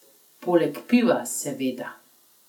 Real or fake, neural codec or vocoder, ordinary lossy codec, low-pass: real; none; none; 19.8 kHz